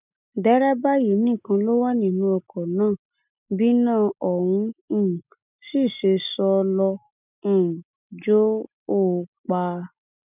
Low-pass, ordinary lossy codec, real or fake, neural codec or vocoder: 3.6 kHz; none; real; none